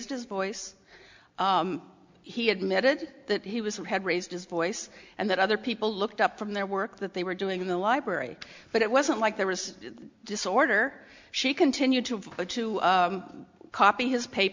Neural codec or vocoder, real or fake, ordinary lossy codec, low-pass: none; real; MP3, 64 kbps; 7.2 kHz